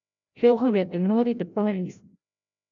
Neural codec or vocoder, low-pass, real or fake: codec, 16 kHz, 0.5 kbps, FreqCodec, larger model; 7.2 kHz; fake